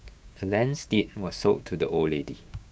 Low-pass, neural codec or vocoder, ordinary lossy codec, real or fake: none; codec, 16 kHz, 6 kbps, DAC; none; fake